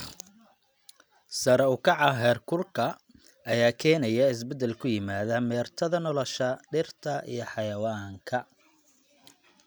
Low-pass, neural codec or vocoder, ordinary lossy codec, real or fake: none; none; none; real